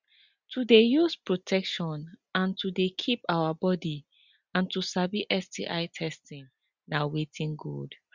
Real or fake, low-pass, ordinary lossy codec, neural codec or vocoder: real; 7.2 kHz; Opus, 64 kbps; none